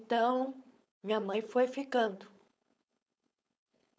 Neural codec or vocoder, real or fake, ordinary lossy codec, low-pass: codec, 16 kHz, 4.8 kbps, FACodec; fake; none; none